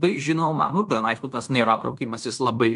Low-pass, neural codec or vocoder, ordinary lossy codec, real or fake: 10.8 kHz; codec, 16 kHz in and 24 kHz out, 0.9 kbps, LongCat-Audio-Codec, fine tuned four codebook decoder; MP3, 96 kbps; fake